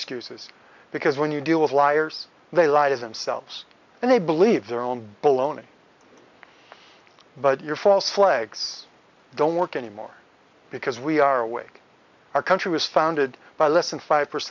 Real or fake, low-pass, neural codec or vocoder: real; 7.2 kHz; none